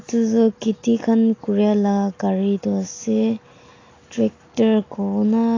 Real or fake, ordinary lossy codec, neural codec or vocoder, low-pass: real; AAC, 32 kbps; none; 7.2 kHz